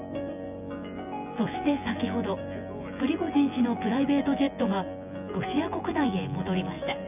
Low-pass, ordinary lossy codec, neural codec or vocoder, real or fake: 3.6 kHz; none; vocoder, 24 kHz, 100 mel bands, Vocos; fake